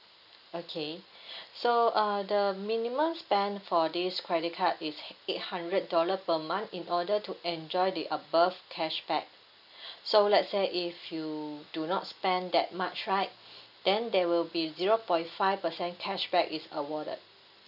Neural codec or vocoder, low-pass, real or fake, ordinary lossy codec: none; 5.4 kHz; real; none